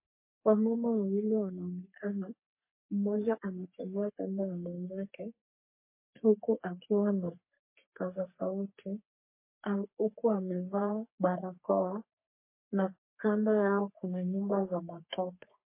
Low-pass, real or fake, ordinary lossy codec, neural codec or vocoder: 3.6 kHz; fake; MP3, 24 kbps; codec, 44.1 kHz, 3.4 kbps, Pupu-Codec